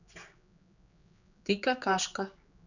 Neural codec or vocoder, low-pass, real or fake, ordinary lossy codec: codec, 16 kHz, 4 kbps, X-Codec, HuBERT features, trained on general audio; 7.2 kHz; fake; none